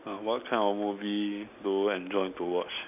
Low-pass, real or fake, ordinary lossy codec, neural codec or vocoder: 3.6 kHz; real; none; none